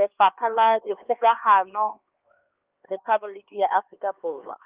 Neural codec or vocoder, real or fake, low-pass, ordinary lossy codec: codec, 16 kHz, 2 kbps, X-Codec, HuBERT features, trained on LibriSpeech; fake; 3.6 kHz; Opus, 32 kbps